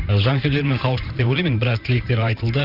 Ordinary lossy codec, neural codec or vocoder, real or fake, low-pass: none; vocoder, 44.1 kHz, 128 mel bands every 512 samples, BigVGAN v2; fake; 5.4 kHz